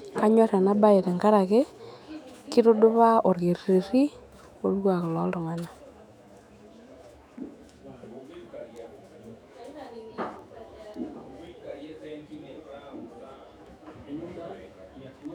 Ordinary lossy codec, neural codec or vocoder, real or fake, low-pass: none; autoencoder, 48 kHz, 128 numbers a frame, DAC-VAE, trained on Japanese speech; fake; 19.8 kHz